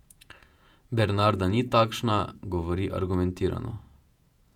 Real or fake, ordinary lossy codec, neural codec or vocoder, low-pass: fake; none; vocoder, 48 kHz, 128 mel bands, Vocos; 19.8 kHz